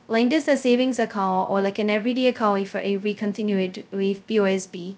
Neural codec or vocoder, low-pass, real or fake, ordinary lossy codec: codec, 16 kHz, 0.2 kbps, FocalCodec; none; fake; none